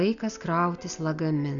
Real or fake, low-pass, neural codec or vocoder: real; 7.2 kHz; none